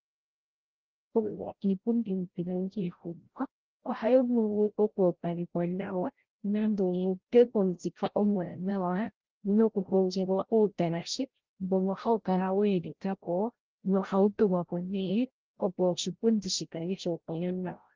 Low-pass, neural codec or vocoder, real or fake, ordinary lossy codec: 7.2 kHz; codec, 16 kHz, 0.5 kbps, FreqCodec, larger model; fake; Opus, 16 kbps